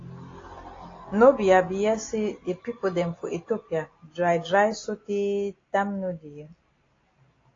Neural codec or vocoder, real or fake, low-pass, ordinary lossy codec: none; real; 7.2 kHz; AAC, 32 kbps